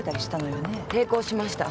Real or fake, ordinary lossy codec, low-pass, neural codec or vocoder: real; none; none; none